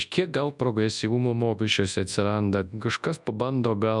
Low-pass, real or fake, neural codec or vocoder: 10.8 kHz; fake; codec, 24 kHz, 0.9 kbps, WavTokenizer, large speech release